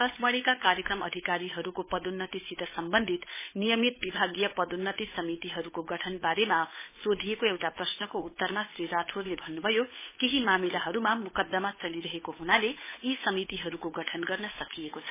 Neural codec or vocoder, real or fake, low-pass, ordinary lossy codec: codec, 16 kHz, 8 kbps, FunCodec, trained on Chinese and English, 25 frames a second; fake; 3.6 kHz; MP3, 16 kbps